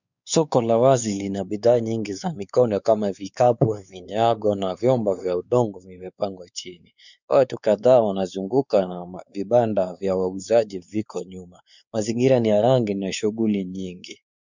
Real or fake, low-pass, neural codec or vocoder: fake; 7.2 kHz; codec, 16 kHz, 4 kbps, X-Codec, WavLM features, trained on Multilingual LibriSpeech